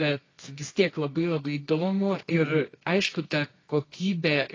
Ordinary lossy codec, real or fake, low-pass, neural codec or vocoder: AAC, 32 kbps; fake; 7.2 kHz; codec, 24 kHz, 0.9 kbps, WavTokenizer, medium music audio release